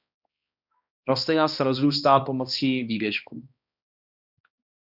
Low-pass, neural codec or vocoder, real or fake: 5.4 kHz; codec, 16 kHz, 1 kbps, X-Codec, HuBERT features, trained on balanced general audio; fake